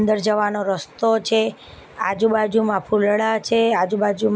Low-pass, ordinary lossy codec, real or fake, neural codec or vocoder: none; none; real; none